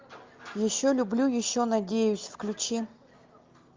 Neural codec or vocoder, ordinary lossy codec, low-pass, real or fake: none; Opus, 24 kbps; 7.2 kHz; real